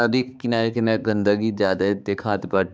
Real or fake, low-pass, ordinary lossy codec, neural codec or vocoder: fake; none; none; codec, 16 kHz, 4 kbps, X-Codec, HuBERT features, trained on balanced general audio